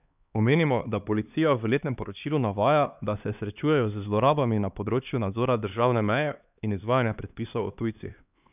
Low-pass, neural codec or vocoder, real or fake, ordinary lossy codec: 3.6 kHz; codec, 16 kHz, 2 kbps, X-Codec, HuBERT features, trained on LibriSpeech; fake; none